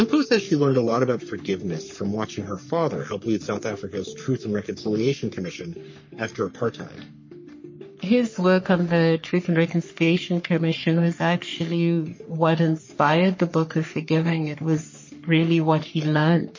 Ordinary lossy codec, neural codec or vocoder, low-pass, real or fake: MP3, 32 kbps; codec, 44.1 kHz, 3.4 kbps, Pupu-Codec; 7.2 kHz; fake